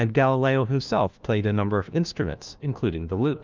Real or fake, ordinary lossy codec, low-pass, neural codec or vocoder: fake; Opus, 24 kbps; 7.2 kHz; codec, 16 kHz, 1 kbps, FunCodec, trained on LibriTTS, 50 frames a second